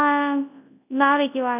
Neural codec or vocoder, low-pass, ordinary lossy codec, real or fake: codec, 24 kHz, 0.9 kbps, WavTokenizer, large speech release; 3.6 kHz; none; fake